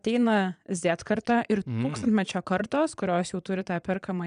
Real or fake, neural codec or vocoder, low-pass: fake; vocoder, 22.05 kHz, 80 mel bands, Vocos; 9.9 kHz